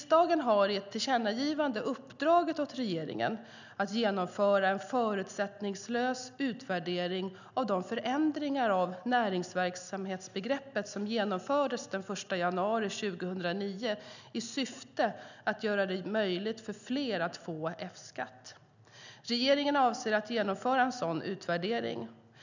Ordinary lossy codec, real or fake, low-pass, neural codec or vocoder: none; real; 7.2 kHz; none